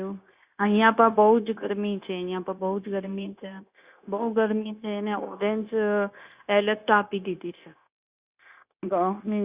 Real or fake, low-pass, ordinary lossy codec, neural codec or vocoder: fake; 3.6 kHz; Opus, 64 kbps; codec, 16 kHz, 0.9 kbps, LongCat-Audio-Codec